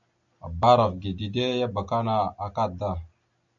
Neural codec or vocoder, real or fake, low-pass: none; real; 7.2 kHz